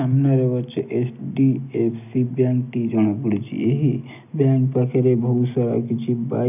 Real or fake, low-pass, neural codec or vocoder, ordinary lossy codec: real; 3.6 kHz; none; none